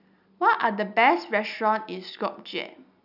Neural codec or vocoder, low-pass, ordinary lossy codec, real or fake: none; 5.4 kHz; none; real